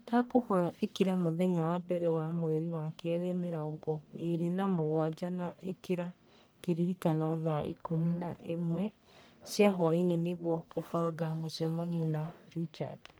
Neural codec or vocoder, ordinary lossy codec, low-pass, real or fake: codec, 44.1 kHz, 1.7 kbps, Pupu-Codec; none; none; fake